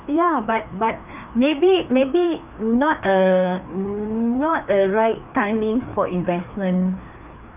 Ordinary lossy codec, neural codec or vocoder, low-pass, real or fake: none; codec, 16 kHz, 2 kbps, FreqCodec, larger model; 3.6 kHz; fake